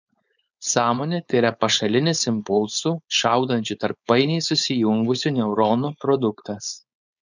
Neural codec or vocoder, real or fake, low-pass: codec, 16 kHz, 4.8 kbps, FACodec; fake; 7.2 kHz